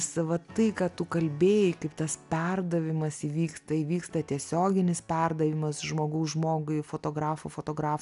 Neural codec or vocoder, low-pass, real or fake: none; 10.8 kHz; real